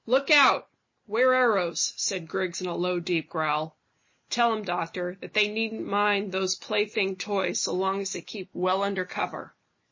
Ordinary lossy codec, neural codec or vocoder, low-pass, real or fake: MP3, 32 kbps; none; 7.2 kHz; real